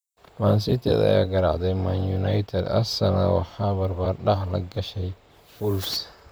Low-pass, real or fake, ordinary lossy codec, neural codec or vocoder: none; fake; none; vocoder, 44.1 kHz, 128 mel bands, Pupu-Vocoder